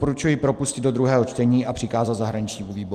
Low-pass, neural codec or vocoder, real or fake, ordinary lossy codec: 14.4 kHz; none; real; Opus, 24 kbps